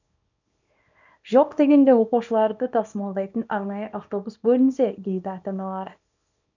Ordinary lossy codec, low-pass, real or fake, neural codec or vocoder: none; 7.2 kHz; fake; codec, 24 kHz, 0.9 kbps, WavTokenizer, small release